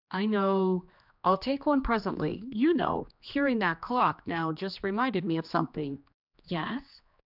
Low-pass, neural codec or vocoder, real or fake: 5.4 kHz; codec, 16 kHz, 2 kbps, X-Codec, HuBERT features, trained on general audio; fake